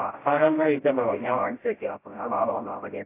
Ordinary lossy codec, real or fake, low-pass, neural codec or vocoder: AAC, 24 kbps; fake; 3.6 kHz; codec, 16 kHz, 0.5 kbps, FreqCodec, smaller model